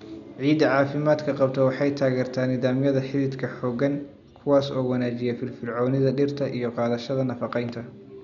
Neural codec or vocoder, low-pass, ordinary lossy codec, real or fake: none; 7.2 kHz; none; real